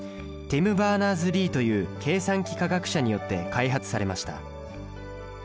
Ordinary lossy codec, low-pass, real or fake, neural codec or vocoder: none; none; real; none